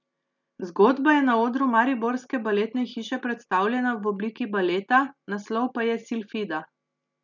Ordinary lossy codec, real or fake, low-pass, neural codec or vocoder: none; real; 7.2 kHz; none